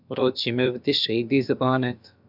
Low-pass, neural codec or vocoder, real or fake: 5.4 kHz; codec, 16 kHz, about 1 kbps, DyCAST, with the encoder's durations; fake